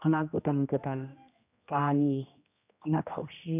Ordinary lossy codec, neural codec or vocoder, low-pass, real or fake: none; codec, 16 kHz, 1 kbps, X-Codec, HuBERT features, trained on general audio; 3.6 kHz; fake